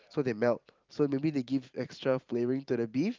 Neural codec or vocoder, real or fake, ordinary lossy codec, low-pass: vocoder, 44.1 kHz, 128 mel bands every 512 samples, BigVGAN v2; fake; Opus, 32 kbps; 7.2 kHz